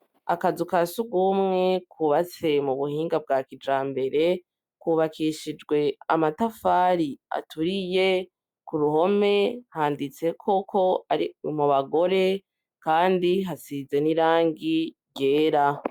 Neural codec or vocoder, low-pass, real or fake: none; 19.8 kHz; real